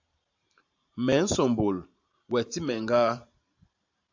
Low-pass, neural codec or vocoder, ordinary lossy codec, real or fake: 7.2 kHz; vocoder, 44.1 kHz, 128 mel bands every 512 samples, BigVGAN v2; AAC, 48 kbps; fake